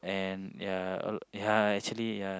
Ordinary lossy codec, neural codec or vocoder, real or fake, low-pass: none; none; real; none